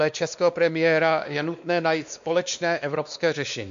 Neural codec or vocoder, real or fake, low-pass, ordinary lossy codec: codec, 16 kHz, 2 kbps, X-Codec, WavLM features, trained on Multilingual LibriSpeech; fake; 7.2 kHz; MP3, 64 kbps